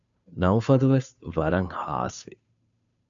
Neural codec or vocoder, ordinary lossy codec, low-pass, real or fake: codec, 16 kHz, 2 kbps, FunCodec, trained on Chinese and English, 25 frames a second; MP3, 64 kbps; 7.2 kHz; fake